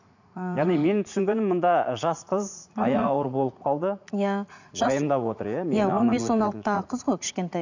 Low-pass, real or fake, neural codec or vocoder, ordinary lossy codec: 7.2 kHz; fake; vocoder, 44.1 kHz, 80 mel bands, Vocos; none